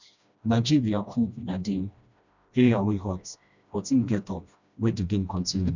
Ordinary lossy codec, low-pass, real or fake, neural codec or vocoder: none; 7.2 kHz; fake; codec, 16 kHz, 1 kbps, FreqCodec, smaller model